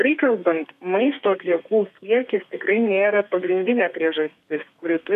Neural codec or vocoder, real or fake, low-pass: codec, 32 kHz, 1.9 kbps, SNAC; fake; 14.4 kHz